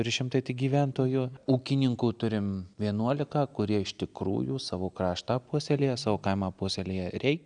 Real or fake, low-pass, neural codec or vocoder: real; 9.9 kHz; none